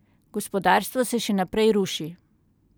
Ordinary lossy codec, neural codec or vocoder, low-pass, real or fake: none; none; none; real